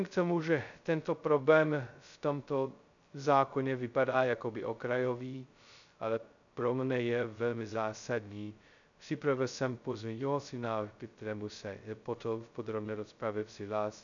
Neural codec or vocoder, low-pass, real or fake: codec, 16 kHz, 0.2 kbps, FocalCodec; 7.2 kHz; fake